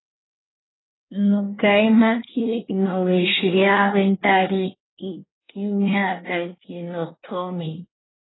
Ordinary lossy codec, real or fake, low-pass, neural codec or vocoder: AAC, 16 kbps; fake; 7.2 kHz; codec, 24 kHz, 1 kbps, SNAC